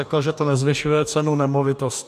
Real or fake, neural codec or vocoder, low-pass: fake; codec, 44.1 kHz, 2.6 kbps, DAC; 14.4 kHz